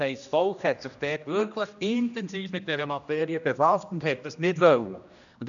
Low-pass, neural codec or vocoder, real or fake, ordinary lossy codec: 7.2 kHz; codec, 16 kHz, 1 kbps, X-Codec, HuBERT features, trained on general audio; fake; none